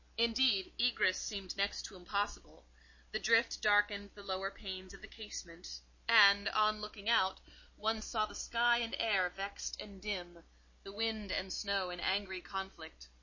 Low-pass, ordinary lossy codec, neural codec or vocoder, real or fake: 7.2 kHz; MP3, 32 kbps; none; real